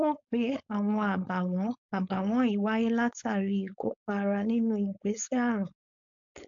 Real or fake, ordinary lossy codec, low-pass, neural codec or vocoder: fake; AAC, 64 kbps; 7.2 kHz; codec, 16 kHz, 4.8 kbps, FACodec